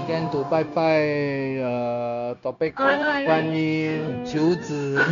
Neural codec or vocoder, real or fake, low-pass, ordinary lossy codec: codec, 16 kHz, 0.9 kbps, LongCat-Audio-Codec; fake; 7.2 kHz; none